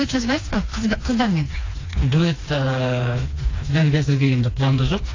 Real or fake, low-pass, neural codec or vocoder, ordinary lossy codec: fake; 7.2 kHz; codec, 16 kHz, 2 kbps, FreqCodec, smaller model; AAC, 32 kbps